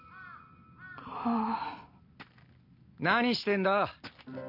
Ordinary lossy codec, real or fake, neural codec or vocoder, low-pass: none; real; none; 5.4 kHz